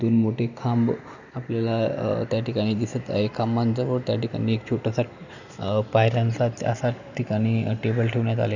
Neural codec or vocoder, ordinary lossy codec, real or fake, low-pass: none; none; real; 7.2 kHz